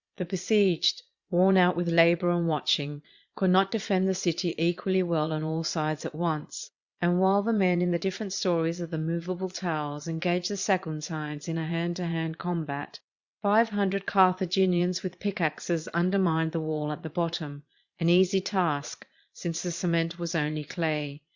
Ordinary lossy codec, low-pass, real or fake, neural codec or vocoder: Opus, 64 kbps; 7.2 kHz; fake; vocoder, 22.05 kHz, 80 mel bands, Vocos